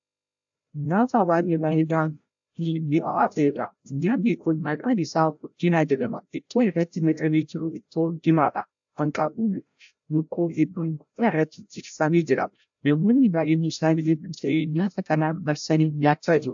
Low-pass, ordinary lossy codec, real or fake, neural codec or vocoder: 7.2 kHz; AAC, 64 kbps; fake; codec, 16 kHz, 0.5 kbps, FreqCodec, larger model